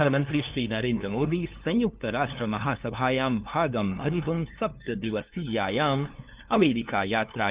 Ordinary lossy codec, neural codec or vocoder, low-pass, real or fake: Opus, 32 kbps; codec, 16 kHz, 2 kbps, FunCodec, trained on LibriTTS, 25 frames a second; 3.6 kHz; fake